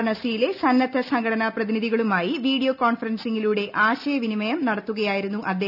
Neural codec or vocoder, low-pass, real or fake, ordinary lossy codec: none; 5.4 kHz; real; none